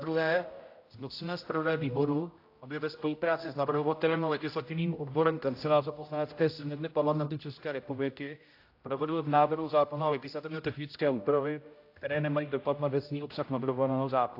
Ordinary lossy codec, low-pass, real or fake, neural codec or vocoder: AAC, 32 kbps; 5.4 kHz; fake; codec, 16 kHz, 0.5 kbps, X-Codec, HuBERT features, trained on general audio